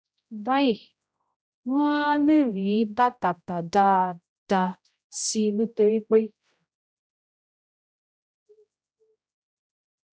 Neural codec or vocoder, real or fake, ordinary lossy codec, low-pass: codec, 16 kHz, 0.5 kbps, X-Codec, HuBERT features, trained on general audio; fake; none; none